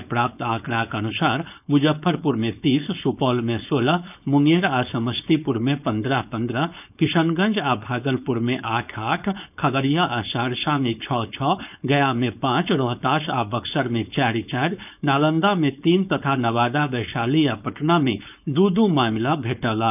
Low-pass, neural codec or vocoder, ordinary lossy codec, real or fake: 3.6 kHz; codec, 16 kHz, 4.8 kbps, FACodec; none; fake